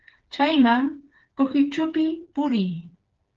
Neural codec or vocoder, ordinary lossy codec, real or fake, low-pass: codec, 16 kHz, 4 kbps, FreqCodec, smaller model; Opus, 16 kbps; fake; 7.2 kHz